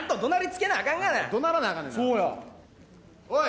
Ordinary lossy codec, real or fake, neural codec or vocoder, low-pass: none; real; none; none